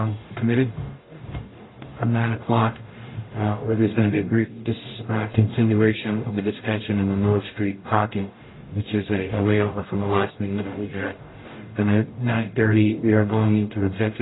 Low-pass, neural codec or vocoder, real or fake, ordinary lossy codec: 7.2 kHz; codec, 44.1 kHz, 0.9 kbps, DAC; fake; AAC, 16 kbps